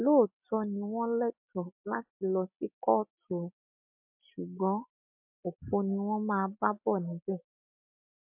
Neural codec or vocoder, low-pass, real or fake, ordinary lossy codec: none; 3.6 kHz; real; MP3, 32 kbps